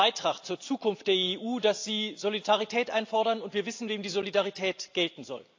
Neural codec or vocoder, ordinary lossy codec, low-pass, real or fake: none; AAC, 48 kbps; 7.2 kHz; real